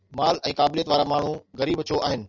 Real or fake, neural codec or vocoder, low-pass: real; none; 7.2 kHz